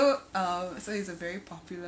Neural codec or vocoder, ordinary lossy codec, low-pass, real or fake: none; none; none; real